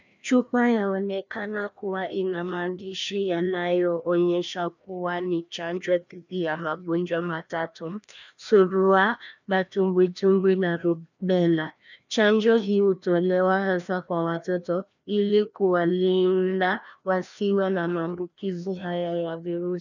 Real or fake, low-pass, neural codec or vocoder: fake; 7.2 kHz; codec, 16 kHz, 1 kbps, FreqCodec, larger model